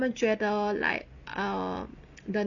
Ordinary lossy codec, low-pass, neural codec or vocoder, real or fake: none; 7.2 kHz; none; real